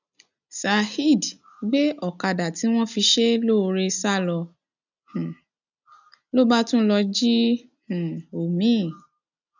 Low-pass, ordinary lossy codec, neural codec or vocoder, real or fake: 7.2 kHz; none; none; real